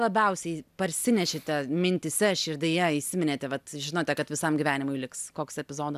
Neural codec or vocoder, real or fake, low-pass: none; real; 14.4 kHz